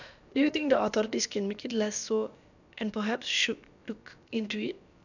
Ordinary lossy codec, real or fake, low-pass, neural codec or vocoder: none; fake; 7.2 kHz; codec, 16 kHz, about 1 kbps, DyCAST, with the encoder's durations